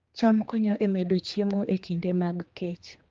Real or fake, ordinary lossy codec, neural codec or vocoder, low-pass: fake; Opus, 32 kbps; codec, 16 kHz, 2 kbps, X-Codec, HuBERT features, trained on general audio; 7.2 kHz